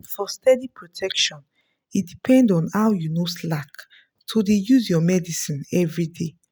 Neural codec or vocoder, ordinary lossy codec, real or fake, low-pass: none; none; real; none